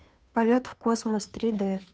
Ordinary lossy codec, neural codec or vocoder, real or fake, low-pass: none; codec, 16 kHz, 2 kbps, FunCodec, trained on Chinese and English, 25 frames a second; fake; none